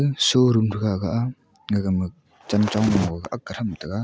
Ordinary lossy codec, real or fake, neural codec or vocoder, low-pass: none; real; none; none